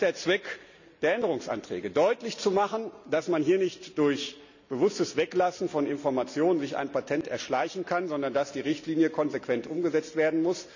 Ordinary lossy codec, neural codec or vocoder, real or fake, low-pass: none; none; real; 7.2 kHz